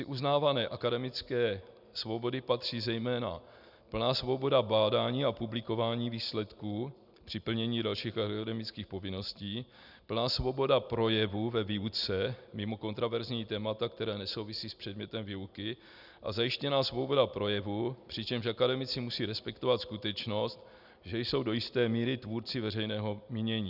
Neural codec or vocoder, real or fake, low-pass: none; real; 5.4 kHz